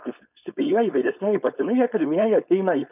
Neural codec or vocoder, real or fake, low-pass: codec, 16 kHz, 4.8 kbps, FACodec; fake; 3.6 kHz